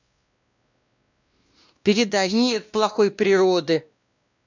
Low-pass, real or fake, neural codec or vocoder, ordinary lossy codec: 7.2 kHz; fake; codec, 16 kHz, 1 kbps, X-Codec, WavLM features, trained on Multilingual LibriSpeech; none